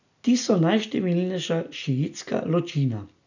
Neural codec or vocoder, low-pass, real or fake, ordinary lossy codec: none; 7.2 kHz; real; none